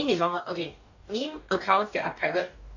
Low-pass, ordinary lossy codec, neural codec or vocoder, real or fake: 7.2 kHz; none; codec, 44.1 kHz, 2.6 kbps, DAC; fake